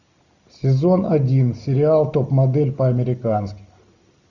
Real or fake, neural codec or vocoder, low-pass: real; none; 7.2 kHz